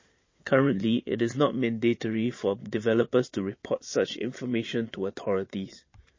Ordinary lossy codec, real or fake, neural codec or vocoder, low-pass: MP3, 32 kbps; fake; vocoder, 22.05 kHz, 80 mel bands, Vocos; 7.2 kHz